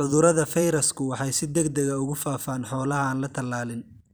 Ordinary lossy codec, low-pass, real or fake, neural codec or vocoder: none; none; fake; vocoder, 44.1 kHz, 128 mel bands every 256 samples, BigVGAN v2